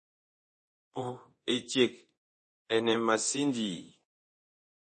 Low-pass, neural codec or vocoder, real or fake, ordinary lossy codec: 10.8 kHz; codec, 24 kHz, 0.9 kbps, DualCodec; fake; MP3, 32 kbps